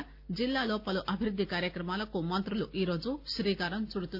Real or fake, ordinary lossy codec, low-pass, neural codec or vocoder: real; Opus, 64 kbps; 5.4 kHz; none